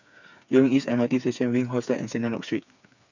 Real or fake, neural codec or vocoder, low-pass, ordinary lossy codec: fake; codec, 16 kHz, 4 kbps, FreqCodec, smaller model; 7.2 kHz; none